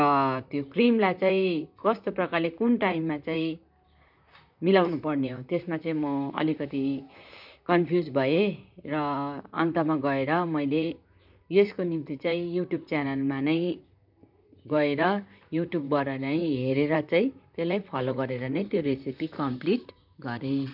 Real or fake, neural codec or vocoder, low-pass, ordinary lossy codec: fake; vocoder, 44.1 kHz, 128 mel bands, Pupu-Vocoder; 5.4 kHz; none